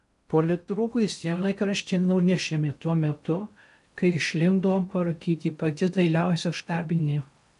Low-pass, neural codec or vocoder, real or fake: 10.8 kHz; codec, 16 kHz in and 24 kHz out, 0.6 kbps, FocalCodec, streaming, 4096 codes; fake